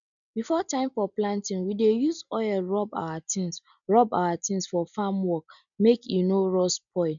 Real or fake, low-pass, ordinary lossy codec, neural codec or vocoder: real; 7.2 kHz; none; none